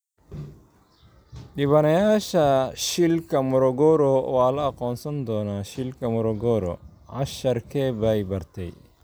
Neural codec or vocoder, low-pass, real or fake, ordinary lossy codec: none; none; real; none